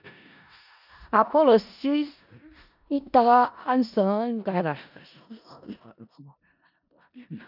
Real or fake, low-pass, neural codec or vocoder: fake; 5.4 kHz; codec, 16 kHz in and 24 kHz out, 0.4 kbps, LongCat-Audio-Codec, four codebook decoder